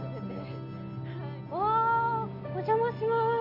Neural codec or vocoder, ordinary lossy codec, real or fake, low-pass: none; none; real; 5.4 kHz